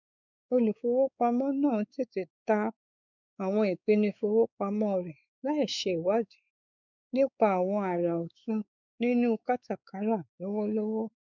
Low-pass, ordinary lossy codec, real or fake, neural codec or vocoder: 7.2 kHz; none; fake; codec, 16 kHz, 4 kbps, X-Codec, WavLM features, trained on Multilingual LibriSpeech